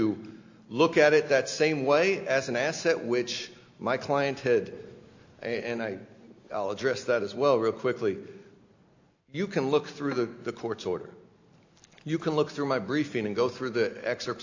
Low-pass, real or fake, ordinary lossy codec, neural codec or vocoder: 7.2 kHz; real; AAC, 48 kbps; none